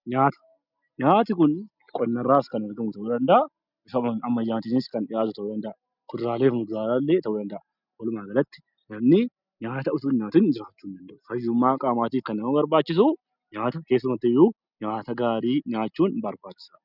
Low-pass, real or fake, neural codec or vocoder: 5.4 kHz; real; none